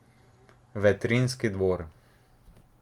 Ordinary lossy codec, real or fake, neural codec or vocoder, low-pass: Opus, 32 kbps; real; none; 19.8 kHz